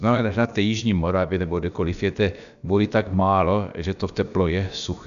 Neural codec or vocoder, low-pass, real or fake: codec, 16 kHz, about 1 kbps, DyCAST, with the encoder's durations; 7.2 kHz; fake